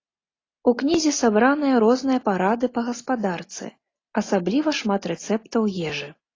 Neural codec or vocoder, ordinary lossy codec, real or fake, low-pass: none; AAC, 32 kbps; real; 7.2 kHz